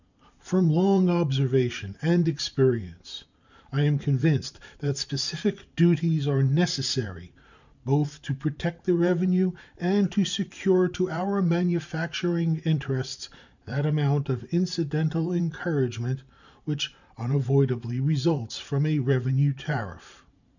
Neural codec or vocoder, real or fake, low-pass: vocoder, 44.1 kHz, 128 mel bands every 512 samples, BigVGAN v2; fake; 7.2 kHz